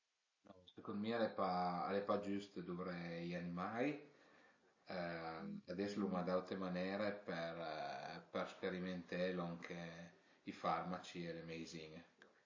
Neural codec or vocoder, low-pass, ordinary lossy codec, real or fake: none; 7.2 kHz; MP3, 32 kbps; real